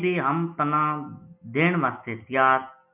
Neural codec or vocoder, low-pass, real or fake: none; 3.6 kHz; real